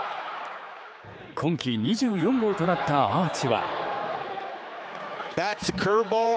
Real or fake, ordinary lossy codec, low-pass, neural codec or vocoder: fake; none; none; codec, 16 kHz, 4 kbps, X-Codec, HuBERT features, trained on general audio